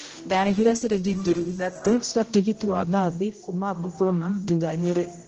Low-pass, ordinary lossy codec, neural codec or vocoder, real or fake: 7.2 kHz; Opus, 16 kbps; codec, 16 kHz, 0.5 kbps, X-Codec, HuBERT features, trained on general audio; fake